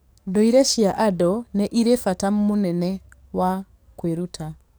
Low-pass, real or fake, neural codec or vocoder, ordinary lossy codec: none; fake; codec, 44.1 kHz, 7.8 kbps, DAC; none